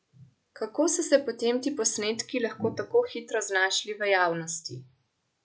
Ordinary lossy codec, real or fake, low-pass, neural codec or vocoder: none; real; none; none